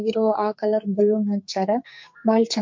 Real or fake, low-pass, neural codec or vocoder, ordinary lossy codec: fake; 7.2 kHz; codec, 44.1 kHz, 2.6 kbps, SNAC; MP3, 48 kbps